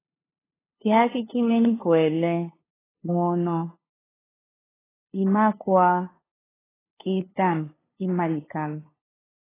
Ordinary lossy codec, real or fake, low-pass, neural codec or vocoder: AAC, 16 kbps; fake; 3.6 kHz; codec, 16 kHz, 2 kbps, FunCodec, trained on LibriTTS, 25 frames a second